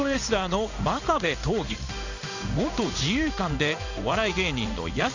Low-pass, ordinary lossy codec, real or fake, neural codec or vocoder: 7.2 kHz; none; fake; codec, 16 kHz in and 24 kHz out, 1 kbps, XY-Tokenizer